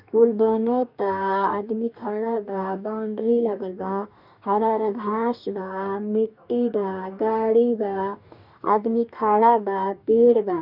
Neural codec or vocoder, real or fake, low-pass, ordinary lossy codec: codec, 44.1 kHz, 2.6 kbps, DAC; fake; 5.4 kHz; none